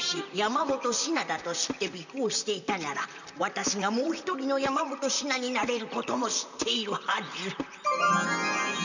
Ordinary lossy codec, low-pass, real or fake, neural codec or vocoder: none; 7.2 kHz; fake; vocoder, 44.1 kHz, 128 mel bands, Pupu-Vocoder